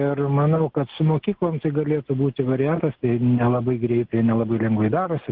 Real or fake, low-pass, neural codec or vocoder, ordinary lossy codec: real; 5.4 kHz; none; Opus, 16 kbps